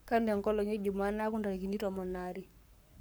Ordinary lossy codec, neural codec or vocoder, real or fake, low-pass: none; codec, 44.1 kHz, 7.8 kbps, Pupu-Codec; fake; none